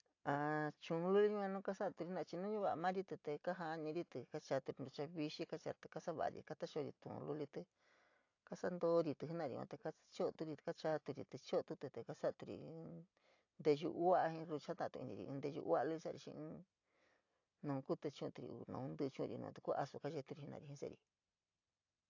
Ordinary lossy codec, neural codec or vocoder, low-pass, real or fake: AAC, 48 kbps; none; 7.2 kHz; real